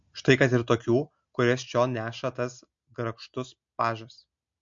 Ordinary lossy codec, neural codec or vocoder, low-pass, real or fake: MP3, 48 kbps; none; 7.2 kHz; real